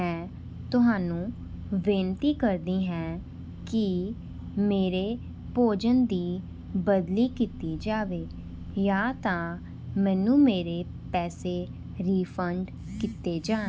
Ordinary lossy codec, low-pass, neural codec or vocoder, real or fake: none; none; none; real